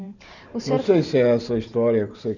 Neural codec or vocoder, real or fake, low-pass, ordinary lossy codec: vocoder, 44.1 kHz, 128 mel bands every 512 samples, BigVGAN v2; fake; 7.2 kHz; none